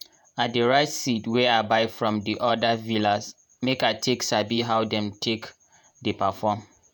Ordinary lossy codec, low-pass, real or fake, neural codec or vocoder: none; none; fake; vocoder, 48 kHz, 128 mel bands, Vocos